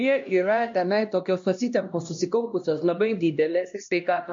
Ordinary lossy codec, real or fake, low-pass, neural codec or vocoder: MP3, 48 kbps; fake; 7.2 kHz; codec, 16 kHz, 1 kbps, X-Codec, HuBERT features, trained on LibriSpeech